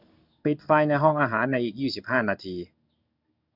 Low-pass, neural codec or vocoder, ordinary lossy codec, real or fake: 5.4 kHz; codec, 16 kHz in and 24 kHz out, 1 kbps, XY-Tokenizer; none; fake